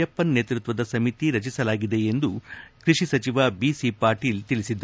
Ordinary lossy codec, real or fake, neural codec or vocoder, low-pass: none; real; none; none